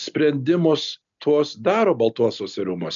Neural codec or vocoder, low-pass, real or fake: none; 7.2 kHz; real